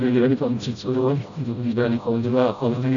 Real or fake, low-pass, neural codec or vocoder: fake; 7.2 kHz; codec, 16 kHz, 0.5 kbps, FreqCodec, smaller model